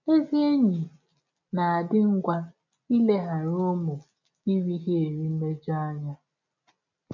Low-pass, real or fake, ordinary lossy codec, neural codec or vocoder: 7.2 kHz; real; none; none